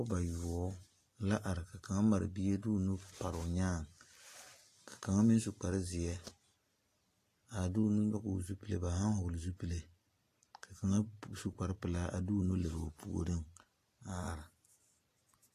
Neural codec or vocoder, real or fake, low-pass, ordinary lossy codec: none; real; 14.4 kHz; AAC, 48 kbps